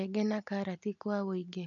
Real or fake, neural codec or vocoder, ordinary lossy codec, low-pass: real; none; none; 7.2 kHz